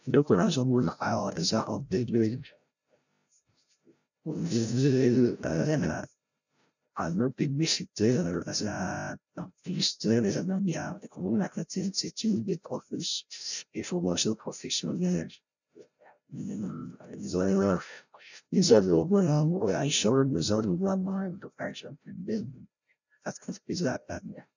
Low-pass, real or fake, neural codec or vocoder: 7.2 kHz; fake; codec, 16 kHz, 0.5 kbps, FreqCodec, larger model